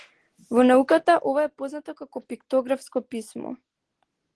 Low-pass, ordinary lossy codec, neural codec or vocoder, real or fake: 10.8 kHz; Opus, 16 kbps; none; real